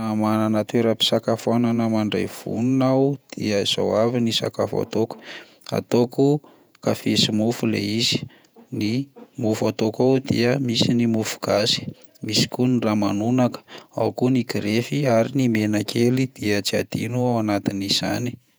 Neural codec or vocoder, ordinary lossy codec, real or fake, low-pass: vocoder, 48 kHz, 128 mel bands, Vocos; none; fake; none